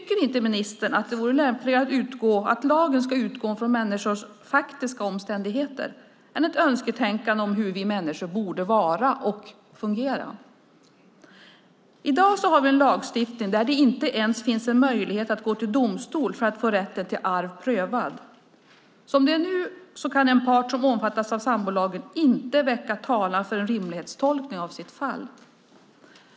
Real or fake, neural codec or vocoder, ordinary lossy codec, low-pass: real; none; none; none